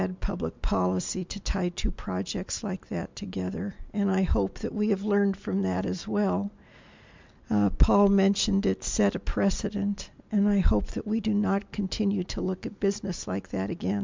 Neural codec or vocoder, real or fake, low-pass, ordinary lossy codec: none; real; 7.2 kHz; MP3, 64 kbps